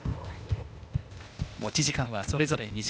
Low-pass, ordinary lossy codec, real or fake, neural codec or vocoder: none; none; fake; codec, 16 kHz, 0.8 kbps, ZipCodec